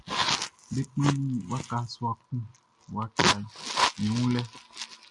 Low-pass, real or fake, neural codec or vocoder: 10.8 kHz; real; none